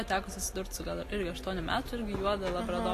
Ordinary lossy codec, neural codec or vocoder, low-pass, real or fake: AAC, 48 kbps; none; 14.4 kHz; real